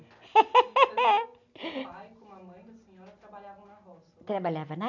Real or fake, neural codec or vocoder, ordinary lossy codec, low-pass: real; none; none; 7.2 kHz